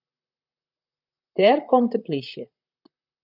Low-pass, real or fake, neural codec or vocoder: 5.4 kHz; fake; vocoder, 44.1 kHz, 128 mel bands, Pupu-Vocoder